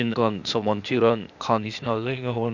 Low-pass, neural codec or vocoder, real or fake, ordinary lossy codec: 7.2 kHz; codec, 16 kHz, 0.8 kbps, ZipCodec; fake; none